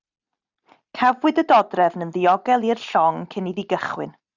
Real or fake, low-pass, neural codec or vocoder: real; 7.2 kHz; none